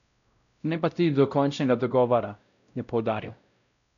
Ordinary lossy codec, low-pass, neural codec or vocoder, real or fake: none; 7.2 kHz; codec, 16 kHz, 0.5 kbps, X-Codec, WavLM features, trained on Multilingual LibriSpeech; fake